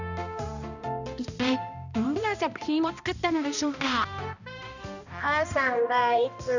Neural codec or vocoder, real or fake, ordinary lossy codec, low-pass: codec, 16 kHz, 1 kbps, X-Codec, HuBERT features, trained on balanced general audio; fake; none; 7.2 kHz